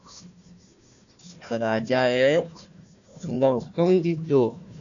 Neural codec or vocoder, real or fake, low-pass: codec, 16 kHz, 1 kbps, FunCodec, trained on Chinese and English, 50 frames a second; fake; 7.2 kHz